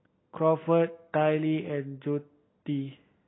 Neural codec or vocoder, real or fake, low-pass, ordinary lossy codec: none; real; 7.2 kHz; AAC, 16 kbps